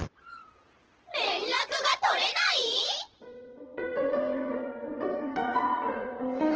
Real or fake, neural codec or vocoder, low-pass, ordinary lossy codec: fake; vocoder, 22.05 kHz, 80 mel bands, Vocos; 7.2 kHz; Opus, 16 kbps